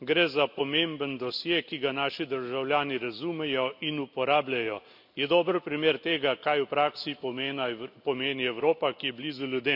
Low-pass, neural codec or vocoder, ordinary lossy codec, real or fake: 5.4 kHz; none; none; real